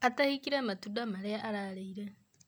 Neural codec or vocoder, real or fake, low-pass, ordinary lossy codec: none; real; none; none